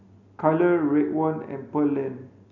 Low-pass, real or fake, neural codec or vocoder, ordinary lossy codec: 7.2 kHz; real; none; none